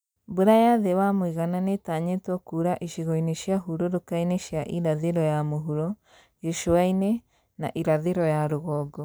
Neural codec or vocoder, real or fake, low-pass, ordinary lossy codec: none; real; none; none